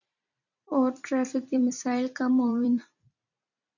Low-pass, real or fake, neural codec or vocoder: 7.2 kHz; fake; vocoder, 44.1 kHz, 128 mel bands every 256 samples, BigVGAN v2